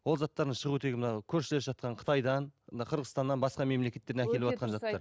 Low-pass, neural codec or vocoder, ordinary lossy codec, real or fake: none; none; none; real